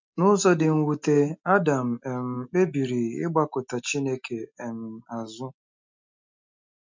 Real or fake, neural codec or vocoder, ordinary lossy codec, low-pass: real; none; MP3, 64 kbps; 7.2 kHz